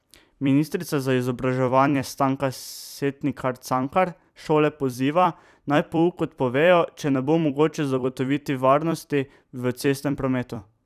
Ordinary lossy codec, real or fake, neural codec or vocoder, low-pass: none; fake; vocoder, 44.1 kHz, 128 mel bands every 256 samples, BigVGAN v2; 14.4 kHz